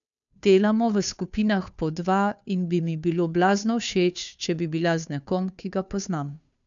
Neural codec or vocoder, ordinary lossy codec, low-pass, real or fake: codec, 16 kHz, 2 kbps, FunCodec, trained on Chinese and English, 25 frames a second; none; 7.2 kHz; fake